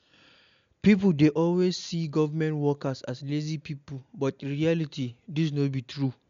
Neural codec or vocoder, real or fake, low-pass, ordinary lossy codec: none; real; 7.2 kHz; MP3, 64 kbps